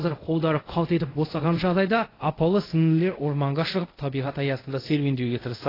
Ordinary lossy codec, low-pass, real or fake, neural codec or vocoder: AAC, 24 kbps; 5.4 kHz; fake; codec, 24 kHz, 0.5 kbps, DualCodec